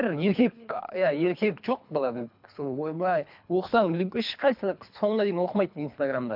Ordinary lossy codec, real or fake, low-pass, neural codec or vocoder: MP3, 48 kbps; fake; 5.4 kHz; codec, 24 kHz, 3 kbps, HILCodec